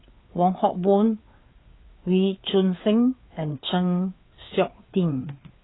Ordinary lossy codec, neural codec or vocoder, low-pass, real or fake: AAC, 16 kbps; codec, 16 kHz, 4 kbps, FreqCodec, larger model; 7.2 kHz; fake